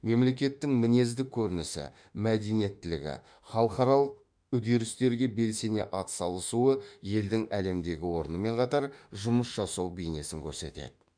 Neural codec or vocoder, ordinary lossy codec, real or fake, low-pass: autoencoder, 48 kHz, 32 numbers a frame, DAC-VAE, trained on Japanese speech; none; fake; 9.9 kHz